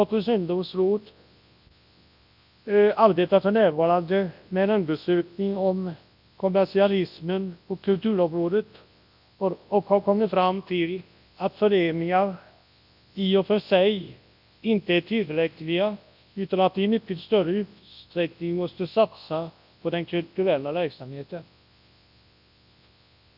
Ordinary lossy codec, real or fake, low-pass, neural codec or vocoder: AAC, 48 kbps; fake; 5.4 kHz; codec, 24 kHz, 0.9 kbps, WavTokenizer, large speech release